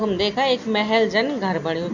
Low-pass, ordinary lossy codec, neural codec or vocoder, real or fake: 7.2 kHz; Opus, 64 kbps; none; real